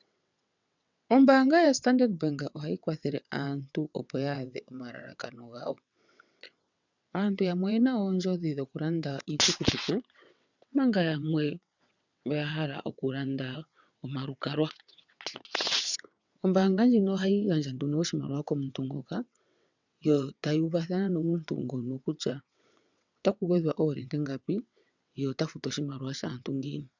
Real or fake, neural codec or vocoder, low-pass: fake; vocoder, 22.05 kHz, 80 mel bands, WaveNeXt; 7.2 kHz